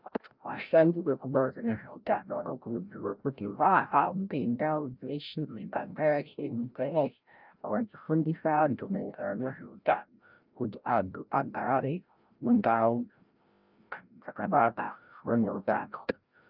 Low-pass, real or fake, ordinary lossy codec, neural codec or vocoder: 5.4 kHz; fake; Opus, 24 kbps; codec, 16 kHz, 0.5 kbps, FreqCodec, larger model